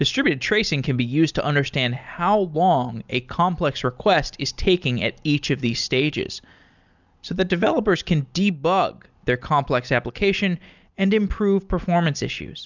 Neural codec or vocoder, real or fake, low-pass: none; real; 7.2 kHz